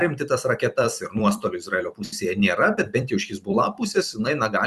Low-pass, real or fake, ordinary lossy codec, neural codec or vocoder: 14.4 kHz; fake; Opus, 64 kbps; vocoder, 44.1 kHz, 128 mel bands every 256 samples, BigVGAN v2